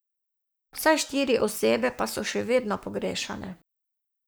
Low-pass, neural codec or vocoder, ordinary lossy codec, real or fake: none; codec, 44.1 kHz, 7.8 kbps, Pupu-Codec; none; fake